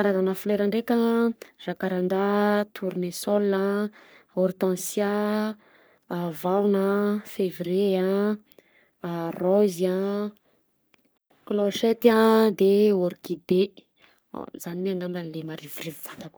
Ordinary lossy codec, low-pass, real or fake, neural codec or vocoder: none; none; fake; codec, 44.1 kHz, 3.4 kbps, Pupu-Codec